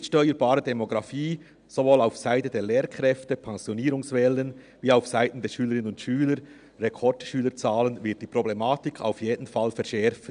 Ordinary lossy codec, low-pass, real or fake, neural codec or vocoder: none; 9.9 kHz; real; none